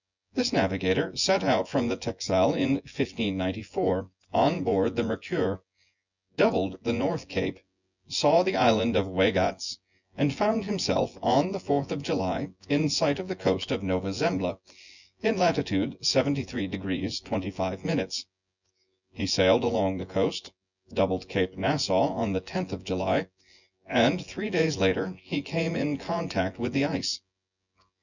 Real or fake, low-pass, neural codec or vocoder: fake; 7.2 kHz; vocoder, 24 kHz, 100 mel bands, Vocos